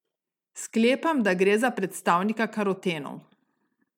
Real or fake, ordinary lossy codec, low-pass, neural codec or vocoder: real; MP3, 96 kbps; 19.8 kHz; none